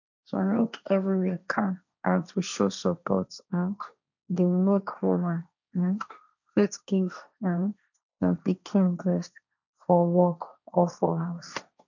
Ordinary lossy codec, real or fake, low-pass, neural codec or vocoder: none; fake; 7.2 kHz; codec, 16 kHz, 1.1 kbps, Voila-Tokenizer